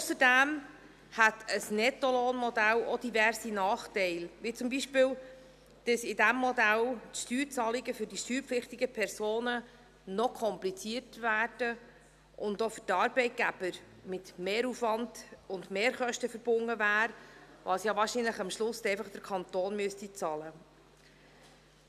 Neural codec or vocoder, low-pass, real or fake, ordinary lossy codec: none; 14.4 kHz; real; none